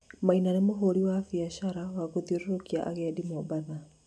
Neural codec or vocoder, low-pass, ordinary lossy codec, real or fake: none; none; none; real